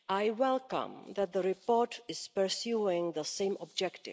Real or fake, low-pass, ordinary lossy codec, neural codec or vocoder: real; none; none; none